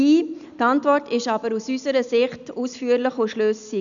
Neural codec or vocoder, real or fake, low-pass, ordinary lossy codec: none; real; 7.2 kHz; none